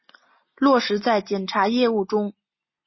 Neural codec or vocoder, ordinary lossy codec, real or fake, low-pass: none; MP3, 24 kbps; real; 7.2 kHz